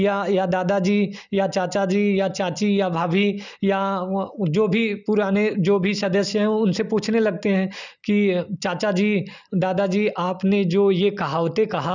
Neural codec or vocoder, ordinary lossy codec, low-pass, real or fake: none; none; 7.2 kHz; real